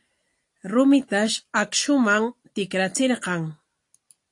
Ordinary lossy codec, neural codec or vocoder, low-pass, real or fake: AAC, 48 kbps; none; 10.8 kHz; real